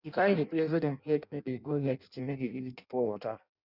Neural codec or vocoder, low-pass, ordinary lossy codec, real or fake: codec, 16 kHz in and 24 kHz out, 0.6 kbps, FireRedTTS-2 codec; 5.4 kHz; none; fake